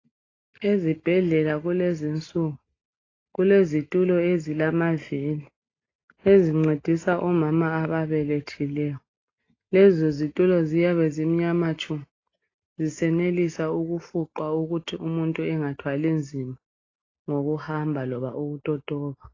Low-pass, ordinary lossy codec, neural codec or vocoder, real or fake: 7.2 kHz; AAC, 32 kbps; none; real